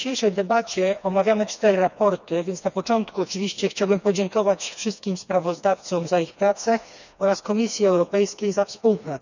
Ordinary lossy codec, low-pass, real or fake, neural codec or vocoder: none; 7.2 kHz; fake; codec, 16 kHz, 2 kbps, FreqCodec, smaller model